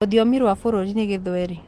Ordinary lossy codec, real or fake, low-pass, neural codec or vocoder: Opus, 24 kbps; real; 14.4 kHz; none